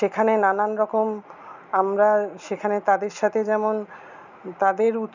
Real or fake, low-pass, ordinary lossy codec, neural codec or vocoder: real; 7.2 kHz; none; none